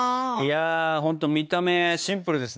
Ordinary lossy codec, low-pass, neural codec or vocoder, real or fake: none; none; codec, 16 kHz, 4 kbps, X-Codec, HuBERT features, trained on LibriSpeech; fake